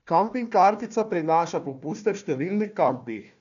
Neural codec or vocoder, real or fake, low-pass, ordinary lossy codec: codec, 16 kHz, 1 kbps, FunCodec, trained on Chinese and English, 50 frames a second; fake; 7.2 kHz; none